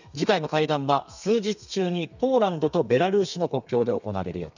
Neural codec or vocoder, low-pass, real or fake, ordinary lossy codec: codec, 32 kHz, 1.9 kbps, SNAC; 7.2 kHz; fake; none